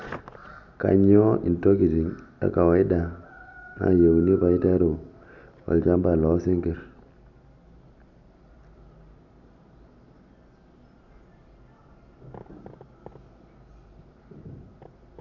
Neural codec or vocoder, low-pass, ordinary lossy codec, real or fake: none; 7.2 kHz; none; real